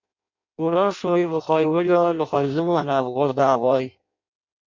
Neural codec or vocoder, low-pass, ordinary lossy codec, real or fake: codec, 16 kHz in and 24 kHz out, 0.6 kbps, FireRedTTS-2 codec; 7.2 kHz; MP3, 48 kbps; fake